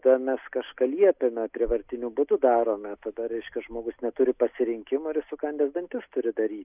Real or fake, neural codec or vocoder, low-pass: real; none; 3.6 kHz